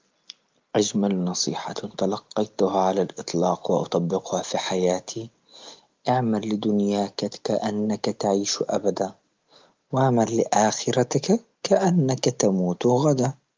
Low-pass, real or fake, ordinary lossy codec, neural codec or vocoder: 7.2 kHz; real; Opus, 24 kbps; none